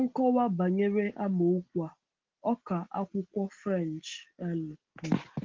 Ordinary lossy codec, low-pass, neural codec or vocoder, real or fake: Opus, 16 kbps; 7.2 kHz; none; real